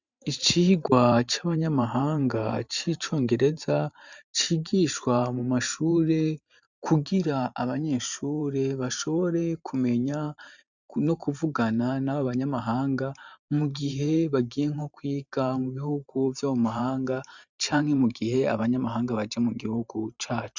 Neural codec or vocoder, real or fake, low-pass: vocoder, 44.1 kHz, 128 mel bands every 512 samples, BigVGAN v2; fake; 7.2 kHz